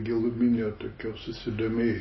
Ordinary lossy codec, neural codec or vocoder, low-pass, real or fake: MP3, 24 kbps; none; 7.2 kHz; real